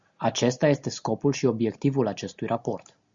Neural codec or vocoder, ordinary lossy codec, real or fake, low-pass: none; Opus, 64 kbps; real; 7.2 kHz